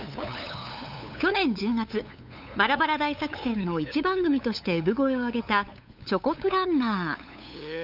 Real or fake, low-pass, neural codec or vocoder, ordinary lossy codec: fake; 5.4 kHz; codec, 16 kHz, 8 kbps, FunCodec, trained on LibriTTS, 25 frames a second; none